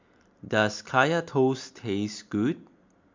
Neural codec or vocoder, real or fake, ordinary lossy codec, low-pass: none; real; MP3, 64 kbps; 7.2 kHz